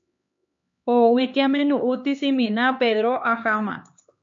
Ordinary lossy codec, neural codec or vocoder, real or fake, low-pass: MP3, 48 kbps; codec, 16 kHz, 2 kbps, X-Codec, HuBERT features, trained on LibriSpeech; fake; 7.2 kHz